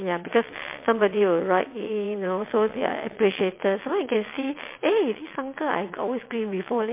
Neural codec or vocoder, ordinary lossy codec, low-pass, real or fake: vocoder, 22.05 kHz, 80 mel bands, WaveNeXt; MP3, 24 kbps; 3.6 kHz; fake